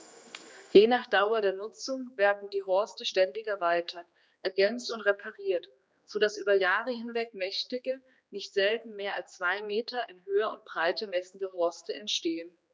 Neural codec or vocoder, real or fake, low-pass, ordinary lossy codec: codec, 16 kHz, 2 kbps, X-Codec, HuBERT features, trained on general audio; fake; none; none